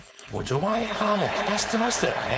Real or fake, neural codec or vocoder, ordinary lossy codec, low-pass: fake; codec, 16 kHz, 4.8 kbps, FACodec; none; none